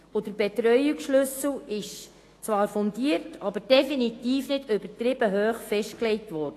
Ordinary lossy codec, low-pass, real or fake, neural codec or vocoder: AAC, 48 kbps; 14.4 kHz; fake; autoencoder, 48 kHz, 128 numbers a frame, DAC-VAE, trained on Japanese speech